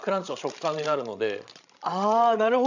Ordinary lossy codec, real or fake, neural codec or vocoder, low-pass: none; fake; codec, 16 kHz, 16 kbps, FreqCodec, larger model; 7.2 kHz